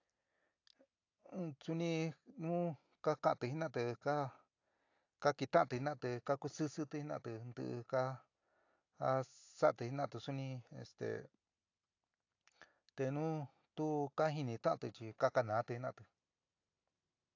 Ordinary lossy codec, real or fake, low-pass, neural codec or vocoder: AAC, 48 kbps; real; 7.2 kHz; none